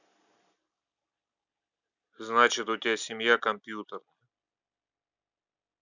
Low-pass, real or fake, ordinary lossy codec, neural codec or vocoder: 7.2 kHz; real; none; none